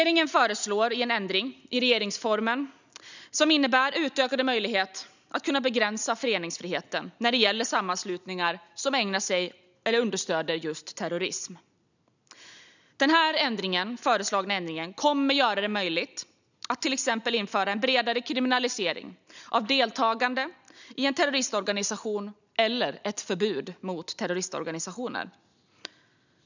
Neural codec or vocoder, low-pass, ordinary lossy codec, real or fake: none; 7.2 kHz; none; real